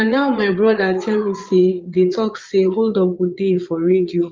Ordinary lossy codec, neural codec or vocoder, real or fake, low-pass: Opus, 24 kbps; vocoder, 22.05 kHz, 80 mel bands, Vocos; fake; 7.2 kHz